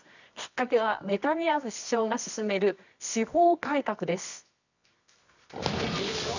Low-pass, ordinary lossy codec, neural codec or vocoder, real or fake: 7.2 kHz; none; codec, 24 kHz, 0.9 kbps, WavTokenizer, medium music audio release; fake